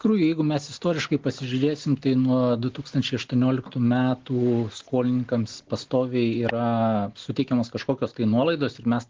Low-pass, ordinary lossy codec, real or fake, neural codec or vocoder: 7.2 kHz; Opus, 16 kbps; real; none